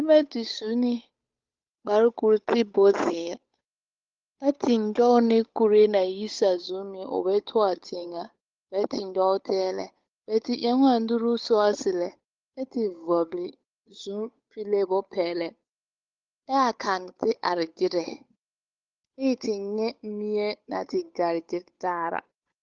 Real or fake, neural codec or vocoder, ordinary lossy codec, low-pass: fake; codec, 16 kHz, 8 kbps, FunCodec, trained on Chinese and English, 25 frames a second; Opus, 32 kbps; 7.2 kHz